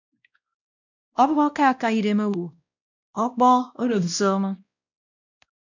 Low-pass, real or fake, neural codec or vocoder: 7.2 kHz; fake; codec, 16 kHz, 1 kbps, X-Codec, WavLM features, trained on Multilingual LibriSpeech